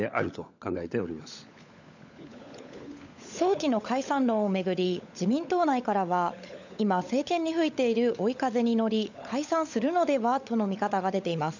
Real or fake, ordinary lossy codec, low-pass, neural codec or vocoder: fake; none; 7.2 kHz; codec, 16 kHz, 16 kbps, FunCodec, trained on LibriTTS, 50 frames a second